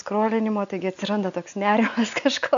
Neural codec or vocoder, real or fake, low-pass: none; real; 7.2 kHz